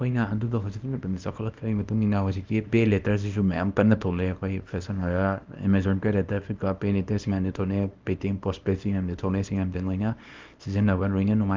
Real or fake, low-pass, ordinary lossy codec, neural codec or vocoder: fake; 7.2 kHz; Opus, 24 kbps; codec, 24 kHz, 0.9 kbps, WavTokenizer, small release